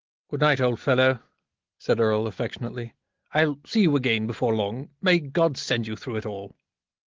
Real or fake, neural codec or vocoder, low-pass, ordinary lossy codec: real; none; 7.2 kHz; Opus, 16 kbps